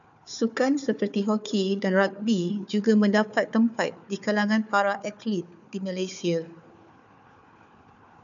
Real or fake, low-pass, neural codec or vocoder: fake; 7.2 kHz; codec, 16 kHz, 4 kbps, FunCodec, trained on Chinese and English, 50 frames a second